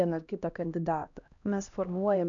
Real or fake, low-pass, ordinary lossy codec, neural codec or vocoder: fake; 7.2 kHz; MP3, 96 kbps; codec, 16 kHz, 1 kbps, X-Codec, HuBERT features, trained on LibriSpeech